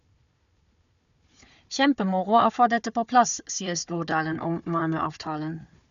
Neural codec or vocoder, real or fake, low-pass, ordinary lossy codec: codec, 16 kHz, 4 kbps, FunCodec, trained on Chinese and English, 50 frames a second; fake; 7.2 kHz; none